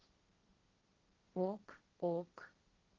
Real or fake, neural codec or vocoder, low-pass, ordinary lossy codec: fake; codec, 16 kHz, 1.1 kbps, Voila-Tokenizer; 7.2 kHz; Opus, 16 kbps